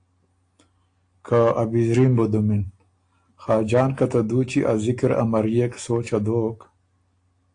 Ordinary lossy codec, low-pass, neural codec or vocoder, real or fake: AAC, 48 kbps; 9.9 kHz; none; real